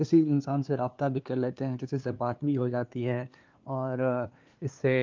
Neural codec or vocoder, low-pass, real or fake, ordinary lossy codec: codec, 16 kHz, 2 kbps, FunCodec, trained on LibriTTS, 25 frames a second; 7.2 kHz; fake; Opus, 24 kbps